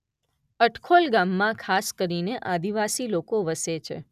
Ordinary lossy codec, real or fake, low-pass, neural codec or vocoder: none; real; 14.4 kHz; none